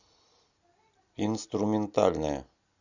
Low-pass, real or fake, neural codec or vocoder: 7.2 kHz; real; none